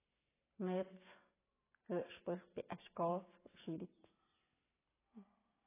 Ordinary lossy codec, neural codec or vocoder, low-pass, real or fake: AAC, 16 kbps; none; 3.6 kHz; real